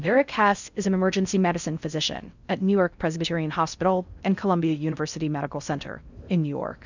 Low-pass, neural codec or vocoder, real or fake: 7.2 kHz; codec, 16 kHz in and 24 kHz out, 0.6 kbps, FocalCodec, streaming, 4096 codes; fake